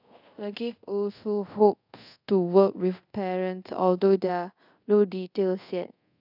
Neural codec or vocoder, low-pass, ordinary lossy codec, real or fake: codec, 24 kHz, 0.5 kbps, DualCodec; 5.4 kHz; none; fake